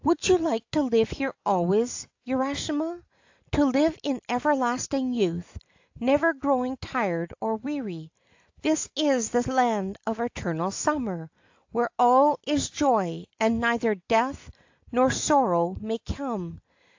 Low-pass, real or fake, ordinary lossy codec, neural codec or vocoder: 7.2 kHz; real; AAC, 48 kbps; none